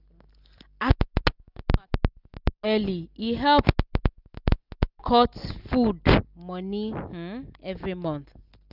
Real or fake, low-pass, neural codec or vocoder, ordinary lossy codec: real; 5.4 kHz; none; none